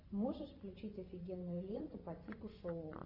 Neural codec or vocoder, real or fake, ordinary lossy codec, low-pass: vocoder, 24 kHz, 100 mel bands, Vocos; fake; AAC, 32 kbps; 5.4 kHz